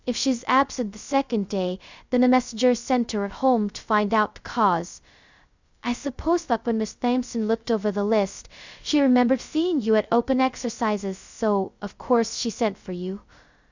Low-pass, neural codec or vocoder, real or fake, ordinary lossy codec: 7.2 kHz; codec, 16 kHz, 0.2 kbps, FocalCodec; fake; Opus, 64 kbps